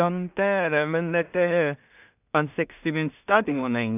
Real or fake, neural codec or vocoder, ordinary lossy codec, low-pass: fake; codec, 16 kHz in and 24 kHz out, 0.4 kbps, LongCat-Audio-Codec, two codebook decoder; none; 3.6 kHz